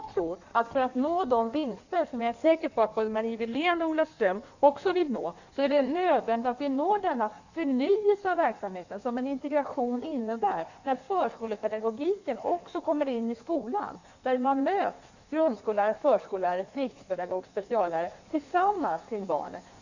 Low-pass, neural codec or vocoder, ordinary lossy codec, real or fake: 7.2 kHz; codec, 16 kHz in and 24 kHz out, 1.1 kbps, FireRedTTS-2 codec; none; fake